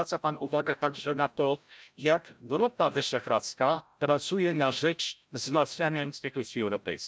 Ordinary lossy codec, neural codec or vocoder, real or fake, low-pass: none; codec, 16 kHz, 0.5 kbps, FreqCodec, larger model; fake; none